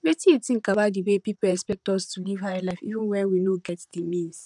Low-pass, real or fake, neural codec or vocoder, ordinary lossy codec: 10.8 kHz; fake; vocoder, 44.1 kHz, 128 mel bands, Pupu-Vocoder; none